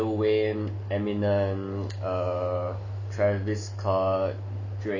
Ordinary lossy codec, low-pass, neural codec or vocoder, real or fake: none; 7.2 kHz; none; real